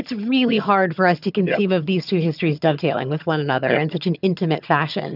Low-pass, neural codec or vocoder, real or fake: 5.4 kHz; vocoder, 22.05 kHz, 80 mel bands, HiFi-GAN; fake